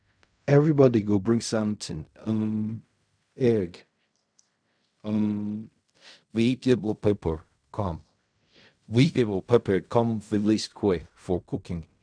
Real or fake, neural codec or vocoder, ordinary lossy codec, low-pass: fake; codec, 16 kHz in and 24 kHz out, 0.4 kbps, LongCat-Audio-Codec, fine tuned four codebook decoder; none; 9.9 kHz